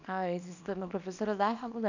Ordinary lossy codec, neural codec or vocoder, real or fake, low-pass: none; codec, 24 kHz, 0.9 kbps, WavTokenizer, small release; fake; 7.2 kHz